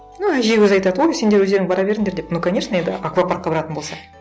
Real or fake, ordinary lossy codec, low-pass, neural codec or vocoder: real; none; none; none